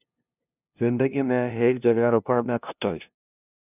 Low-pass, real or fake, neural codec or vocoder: 3.6 kHz; fake; codec, 16 kHz, 0.5 kbps, FunCodec, trained on LibriTTS, 25 frames a second